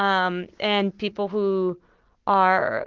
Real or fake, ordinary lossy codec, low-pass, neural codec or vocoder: real; Opus, 16 kbps; 7.2 kHz; none